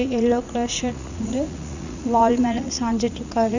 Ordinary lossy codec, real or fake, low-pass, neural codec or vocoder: none; fake; 7.2 kHz; vocoder, 44.1 kHz, 80 mel bands, Vocos